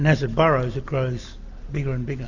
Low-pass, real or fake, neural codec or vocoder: 7.2 kHz; real; none